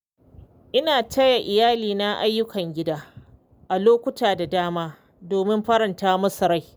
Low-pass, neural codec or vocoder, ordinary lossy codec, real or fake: none; none; none; real